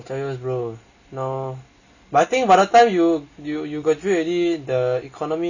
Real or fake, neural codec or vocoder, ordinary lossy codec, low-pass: real; none; none; 7.2 kHz